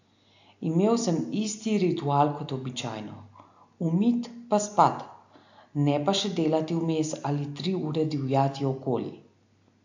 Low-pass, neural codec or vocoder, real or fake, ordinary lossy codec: 7.2 kHz; none; real; AAC, 48 kbps